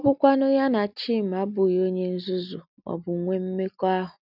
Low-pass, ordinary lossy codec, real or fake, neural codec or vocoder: 5.4 kHz; none; real; none